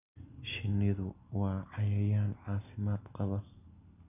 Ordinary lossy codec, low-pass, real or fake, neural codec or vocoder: none; 3.6 kHz; real; none